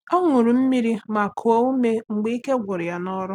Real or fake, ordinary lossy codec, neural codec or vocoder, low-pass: real; none; none; 19.8 kHz